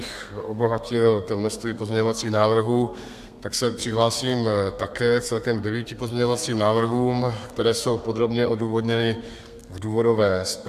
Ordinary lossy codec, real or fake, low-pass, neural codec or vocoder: AAC, 96 kbps; fake; 14.4 kHz; codec, 32 kHz, 1.9 kbps, SNAC